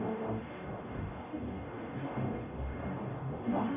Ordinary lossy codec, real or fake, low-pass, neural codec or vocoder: AAC, 32 kbps; fake; 3.6 kHz; codec, 44.1 kHz, 0.9 kbps, DAC